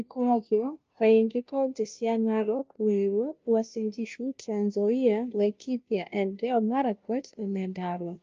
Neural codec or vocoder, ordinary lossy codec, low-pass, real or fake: codec, 16 kHz, 0.5 kbps, FunCodec, trained on Chinese and English, 25 frames a second; Opus, 24 kbps; 7.2 kHz; fake